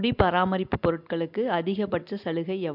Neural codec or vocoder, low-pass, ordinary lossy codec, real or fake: none; 5.4 kHz; MP3, 48 kbps; real